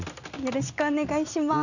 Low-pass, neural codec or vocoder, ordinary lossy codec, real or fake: 7.2 kHz; none; none; real